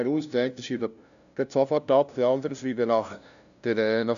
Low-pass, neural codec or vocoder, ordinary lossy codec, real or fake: 7.2 kHz; codec, 16 kHz, 0.5 kbps, FunCodec, trained on LibriTTS, 25 frames a second; none; fake